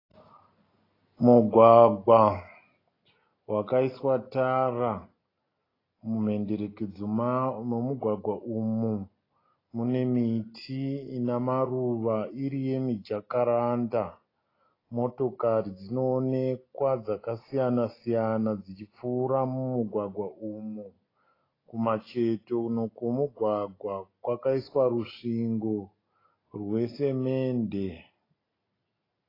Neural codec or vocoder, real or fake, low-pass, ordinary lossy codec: none; real; 5.4 kHz; AAC, 24 kbps